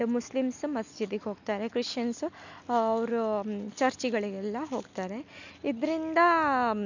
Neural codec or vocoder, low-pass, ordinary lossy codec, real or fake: none; 7.2 kHz; none; real